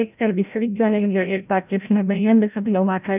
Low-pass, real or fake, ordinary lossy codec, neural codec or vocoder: 3.6 kHz; fake; none; codec, 16 kHz, 0.5 kbps, FreqCodec, larger model